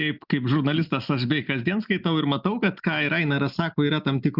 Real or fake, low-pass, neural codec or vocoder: real; 5.4 kHz; none